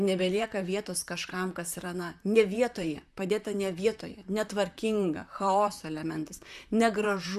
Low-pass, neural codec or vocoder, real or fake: 14.4 kHz; vocoder, 44.1 kHz, 128 mel bands, Pupu-Vocoder; fake